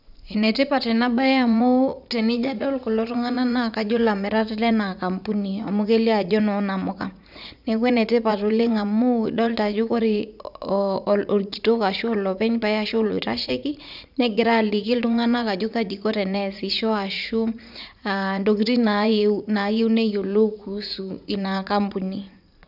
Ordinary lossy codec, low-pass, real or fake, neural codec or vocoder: none; 5.4 kHz; fake; vocoder, 44.1 kHz, 80 mel bands, Vocos